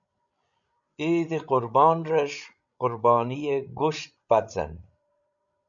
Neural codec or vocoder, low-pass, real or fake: codec, 16 kHz, 16 kbps, FreqCodec, larger model; 7.2 kHz; fake